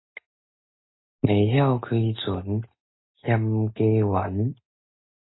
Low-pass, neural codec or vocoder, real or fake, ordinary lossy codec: 7.2 kHz; none; real; AAC, 16 kbps